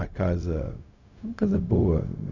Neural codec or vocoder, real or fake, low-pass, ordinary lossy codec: codec, 16 kHz, 0.4 kbps, LongCat-Audio-Codec; fake; 7.2 kHz; none